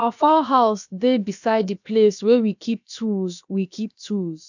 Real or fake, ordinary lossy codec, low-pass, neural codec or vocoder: fake; none; 7.2 kHz; codec, 16 kHz, about 1 kbps, DyCAST, with the encoder's durations